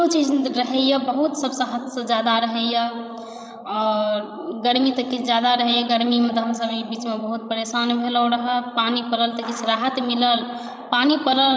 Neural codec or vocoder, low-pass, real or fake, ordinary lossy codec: codec, 16 kHz, 16 kbps, FreqCodec, larger model; none; fake; none